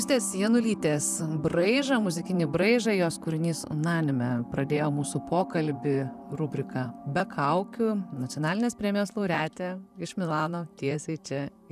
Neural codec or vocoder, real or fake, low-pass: vocoder, 44.1 kHz, 128 mel bands, Pupu-Vocoder; fake; 14.4 kHz